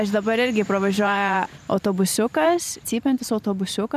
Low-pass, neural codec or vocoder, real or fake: 14.4 kHz; vocoder, 44.1 kHz, 128 mel bands every 512 samples, BigVGAN v2; fake